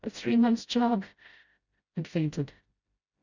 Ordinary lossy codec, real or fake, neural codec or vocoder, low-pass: Opus, 64 kbps; fake; codec, 16 kHz, 0.5 kbps, FreqCodec, smaller model; 7.2 kHz